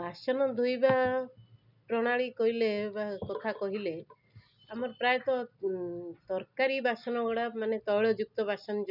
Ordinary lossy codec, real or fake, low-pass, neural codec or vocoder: none; real; 5.4 kHz; none